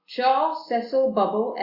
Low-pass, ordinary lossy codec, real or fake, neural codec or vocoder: 5.4 kHz; Opus, 64 kbps; real; none